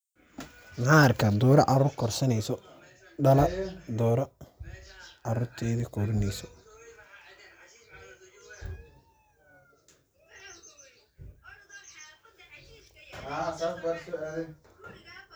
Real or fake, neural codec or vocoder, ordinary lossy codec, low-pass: real; none; none; none